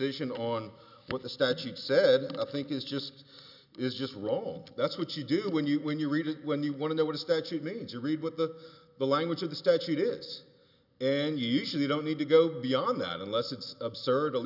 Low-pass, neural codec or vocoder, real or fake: 5.4 kHz; none; real